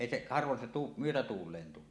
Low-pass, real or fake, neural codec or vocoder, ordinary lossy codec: none; real; none; none